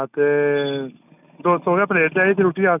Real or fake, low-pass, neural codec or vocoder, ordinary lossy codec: real; 3.6 kHz; none; none